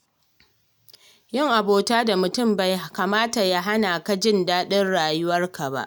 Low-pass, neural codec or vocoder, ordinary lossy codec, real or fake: none; none; none; real